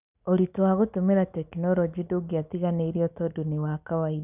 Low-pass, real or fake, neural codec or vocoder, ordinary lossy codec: 3.6 kHz; real; none; none